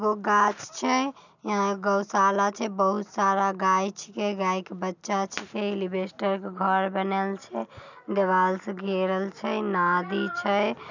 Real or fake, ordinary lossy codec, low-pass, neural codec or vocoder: real; none; 7.2 kHz; none